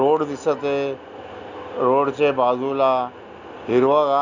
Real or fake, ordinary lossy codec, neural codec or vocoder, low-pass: real; none; none; 7.2 kHz